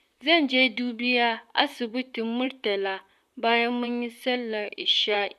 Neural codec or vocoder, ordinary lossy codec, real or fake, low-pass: vocoder, 44.1 kHz, 128 mel bands, Pupu-Vocoder; none; fake; 14.4 kHz